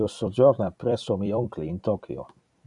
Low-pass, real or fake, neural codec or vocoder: 10.8 kHz; fake; vocoder, 44.1 kHz, 128 mel bands every 256 samples, BigVGAN v2